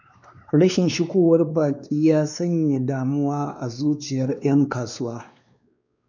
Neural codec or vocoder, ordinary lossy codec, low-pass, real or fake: codec, 16 kHz, 2 kbps, X-Codec, WavLM features, trained on Multilingual LibriSpeech; none; 7.2 kHz; fake